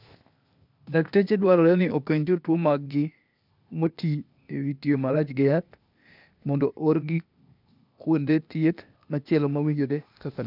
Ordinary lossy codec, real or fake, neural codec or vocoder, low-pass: none; fake; codec, 16 kHz, 0.8 kbps, ZipCodec; 5.4 kHz